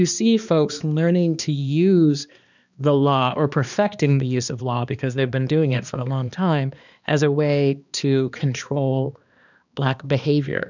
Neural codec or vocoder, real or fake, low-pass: codec, 16 kHz, 2 kbps, X-Codec, HuBERT features, trained on balanced general audio; fake; 7.2 kHz